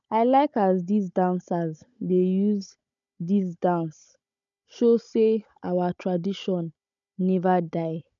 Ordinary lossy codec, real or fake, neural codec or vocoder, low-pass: none; fake; codec, 16 kHz, 16 kbps, FunCodec, trained on Chinese and English, 50 frames a second; 7.2 kHz